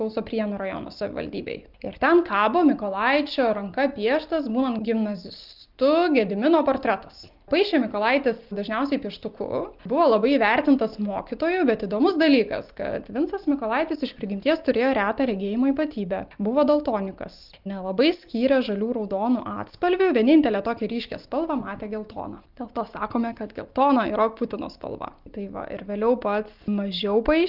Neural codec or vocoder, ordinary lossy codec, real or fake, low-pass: none; Opus, 32 kbps; real; 5.4 kHz